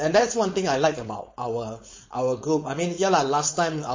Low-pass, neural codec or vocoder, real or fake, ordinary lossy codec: 7.2 kHz; codec, 16 kHz, 4.8 kbps, FACodec; fake; MP3, 32 kbps